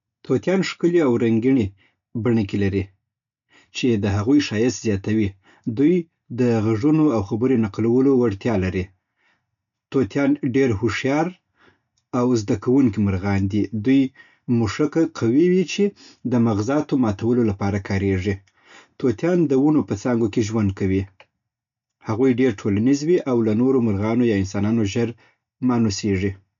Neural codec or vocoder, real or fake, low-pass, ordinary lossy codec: none; real; 7.2 kHz; MP3, 96 kbps